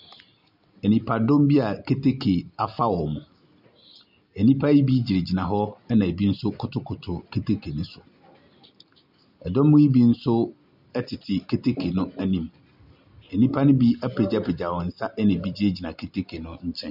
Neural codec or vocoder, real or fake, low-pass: none; real; 5.4 kHz